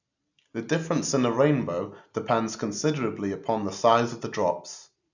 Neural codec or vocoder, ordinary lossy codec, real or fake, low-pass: none; none; real; 7.2 kHz